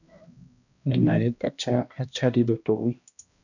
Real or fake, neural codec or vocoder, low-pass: fake; codec, 16 kHz, 1 kbps, X-Codec, HuBERT features, trained on balanced general audio; 7.2 kHz